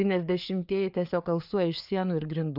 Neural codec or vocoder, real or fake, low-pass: codec, 16 kHz, 4 kbps, FreqCodec, larger model; fake; 5.4 kHz